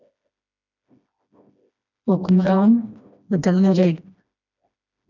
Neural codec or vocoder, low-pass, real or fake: codec, 16 kHz, 1 kbps, FreqCodec, smaller model; 7.2 kHz; fake